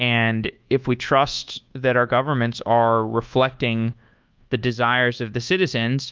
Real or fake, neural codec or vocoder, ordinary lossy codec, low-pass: fake; codec, 24 kHz, 1.2 kbps, DualCodec; Opus, 24 kbps; 7.2 kHz